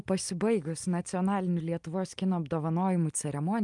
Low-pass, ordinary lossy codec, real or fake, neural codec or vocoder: 10.8 kHz; Opus, 32 kbps; real; none